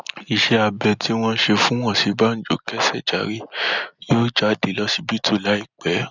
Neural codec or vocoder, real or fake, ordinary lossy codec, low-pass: none; real; none; 7.2 kHz